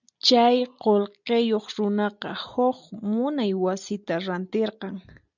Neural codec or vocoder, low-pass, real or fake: none; 7.2 kHz; real